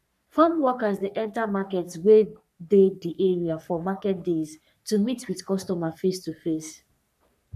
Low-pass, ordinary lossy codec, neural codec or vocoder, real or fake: 14.4 kHz; none; codec, 44.1 kHz, 3.4 kbps, Pupu-Codec; fake